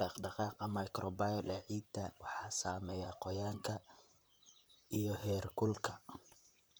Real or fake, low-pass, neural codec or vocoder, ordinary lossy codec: fake; none; vocoder, 44.1 kHz, 128 mel bands, Pupu-Vocoder; none